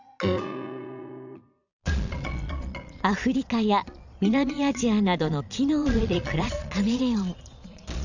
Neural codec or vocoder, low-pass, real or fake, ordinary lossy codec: vocoder, 22.05 kHz, 80 mel bands, Vocos; 7.2 kHz; fake; none